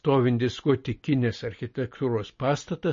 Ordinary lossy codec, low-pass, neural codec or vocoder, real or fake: MP3, 32 kbps; 7.2 kHz; none; real